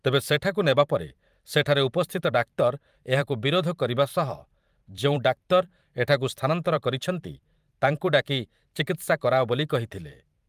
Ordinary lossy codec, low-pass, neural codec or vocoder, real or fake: Opus, 32 kbps; 14.4 kHz; vocoder, 44.1 kHz, 128 mel bands every 512 samples, BigVGAN v2; fake